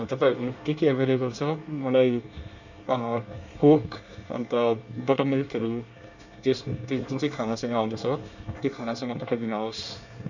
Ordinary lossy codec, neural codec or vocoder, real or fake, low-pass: none; codec, 24 kHz, 1 kbps, SNAC; fake; 7.2 kHz